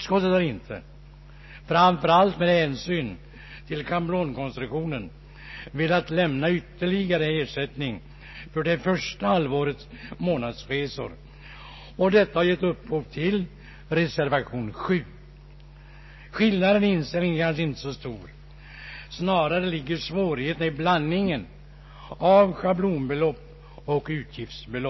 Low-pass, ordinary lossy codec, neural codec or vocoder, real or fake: 7.2 kHz; MP3, 24 kbps; none; real